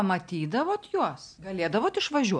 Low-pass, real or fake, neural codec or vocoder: 9.9 kHz; real; none